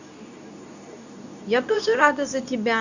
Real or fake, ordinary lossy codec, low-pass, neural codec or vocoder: fake; none; 7.2 kHz; codec, 24 kHz, 0.9 kbps, WavTokenizer, medium speech release version 2